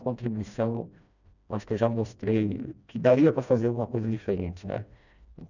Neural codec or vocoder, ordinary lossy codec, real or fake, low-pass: codec, 16 kHz, 1 kbps, FreqCodec, smaller model; none; fake; 7.2 kHz